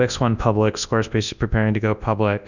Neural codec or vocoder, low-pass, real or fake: codec, 24 kHz, 0.9 kbps, WavTokenizer, large speech release; 7.2 kHz; fake